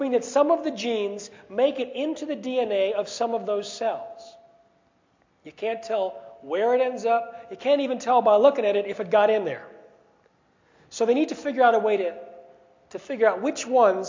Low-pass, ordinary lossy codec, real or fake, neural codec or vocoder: 7.2 kHz; MP3, 48 kbps; real; none